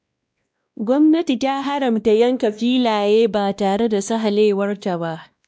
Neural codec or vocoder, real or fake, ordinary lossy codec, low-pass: codec, 16 kHz, 1 kbps, X-Codec, WavLM features, trained on Multilingual LibriSpeech; fake; none; none